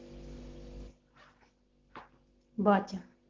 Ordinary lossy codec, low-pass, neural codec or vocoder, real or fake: Opus, 16 kbps; 7.2 kHz; none; real